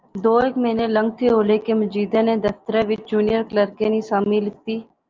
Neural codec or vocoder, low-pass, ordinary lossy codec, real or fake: none; 7.2 kHz; Opus, 24 kbps; real